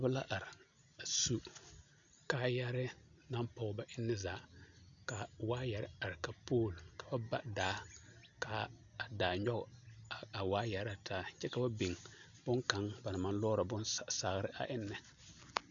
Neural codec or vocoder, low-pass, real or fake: none; 7.2 kHz; real